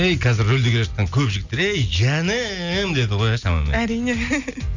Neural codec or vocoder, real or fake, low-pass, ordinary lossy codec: none; real; 7.2 kHz; none